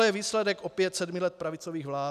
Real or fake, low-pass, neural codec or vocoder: real; 14.4 kHz; none